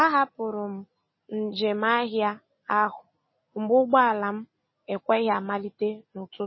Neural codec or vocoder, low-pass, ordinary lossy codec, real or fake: none; 7.2 kHz; MP3, 24 kbps; real